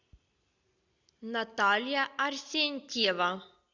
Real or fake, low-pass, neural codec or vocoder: real; 7.2 kHz; none